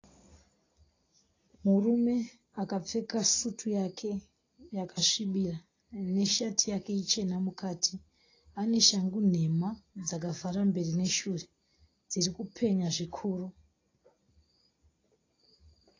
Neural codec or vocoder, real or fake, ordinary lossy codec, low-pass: none; real; AAC, 32 kbps; 7.2 kHz